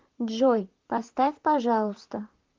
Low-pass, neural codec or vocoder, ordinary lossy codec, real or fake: 7.2 kHz; vocoder, 44.1 kHz, 128 mel bands, Pupu-Vocoder; Opus, 32 kbps; fake